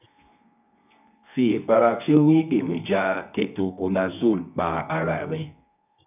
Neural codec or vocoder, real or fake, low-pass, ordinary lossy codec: codec, 24 kHz, 0.9 kbps, WavTokenizer, medium music audio release; fake; 3.6 kHz; none